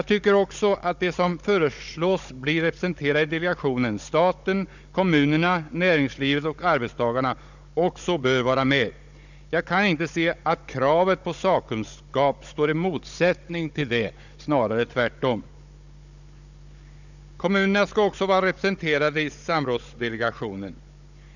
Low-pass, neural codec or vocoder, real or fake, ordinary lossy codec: 7.2 kHz; codec, 16 kHz, 16 kbps, FunCodec, trained on Chinese and English, 50 frames a second; fake; none